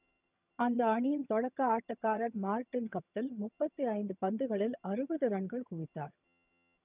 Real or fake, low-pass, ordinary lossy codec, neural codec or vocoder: fake; 3.6 kHz; none; vocoder, 22.05 kHz, 80 mel bands, HiFi-GAN